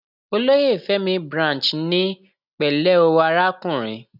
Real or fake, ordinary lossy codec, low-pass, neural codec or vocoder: real; none; 5.4 kHz; none